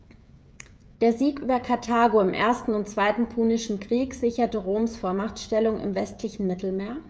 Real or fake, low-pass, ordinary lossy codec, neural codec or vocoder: fake; none; none; codec, 16 kHz, 16 kbps, FreqCodec, smaller model